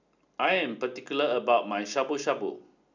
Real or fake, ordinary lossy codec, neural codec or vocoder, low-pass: real; none; none; 7.2 kHz